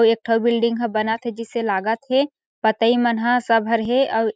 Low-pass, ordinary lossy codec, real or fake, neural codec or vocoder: none; none; real; none